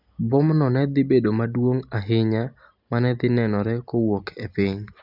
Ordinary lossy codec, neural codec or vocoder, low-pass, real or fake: none; none; 5.4 kHz; real